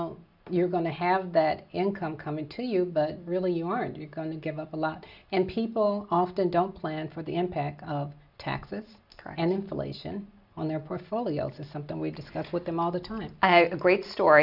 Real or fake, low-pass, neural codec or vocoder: real; 5.4 kHz; none